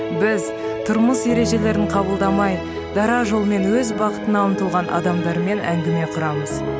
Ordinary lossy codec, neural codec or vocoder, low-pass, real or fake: none; none; none; real